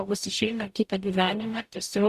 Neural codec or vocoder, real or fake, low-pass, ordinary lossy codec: codec, 44.1 kHz, 0.9 kbps, DAC; fake; 14.4 kHz; Opus, 64 kbps